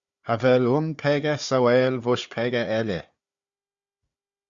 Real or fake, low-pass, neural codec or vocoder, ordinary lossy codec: fake; 7.2 kHz; codec, 16 kHz, 4 kbps, FunCodec, trained on Chinese and English, 50 frames a second; Opus, 64 kbps